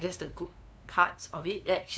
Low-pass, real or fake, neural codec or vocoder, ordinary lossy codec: none; fake; codec, 16 kHz, 2 kbps, FunCodec, trained on LibriTTS, 25 frames a second; none